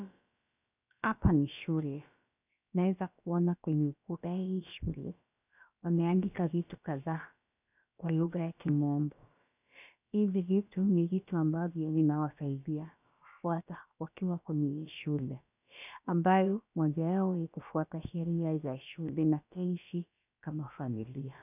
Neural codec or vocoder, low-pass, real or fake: codec, 16 kHz, about 1 kbps, DyCAST, with the encoder's durations; 3.6 kHz; fake